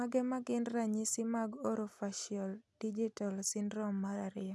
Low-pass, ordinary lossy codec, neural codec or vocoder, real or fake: none; none; none; real